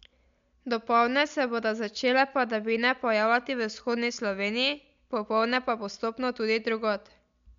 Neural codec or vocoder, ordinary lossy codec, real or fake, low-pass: none; MP3, 64 kbps; real; 7.2 kHz